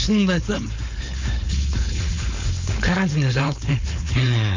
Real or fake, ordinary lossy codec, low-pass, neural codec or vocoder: fake; MP3, 64 kbps; 7.2 kHz; codec, 16 kHz, 4.8 kbps, FACodec